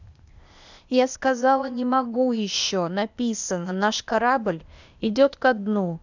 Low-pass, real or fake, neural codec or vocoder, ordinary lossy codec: 7.2 kHz; fake; codec, 16 kHz, 0.8 kbps, ZipCodec; none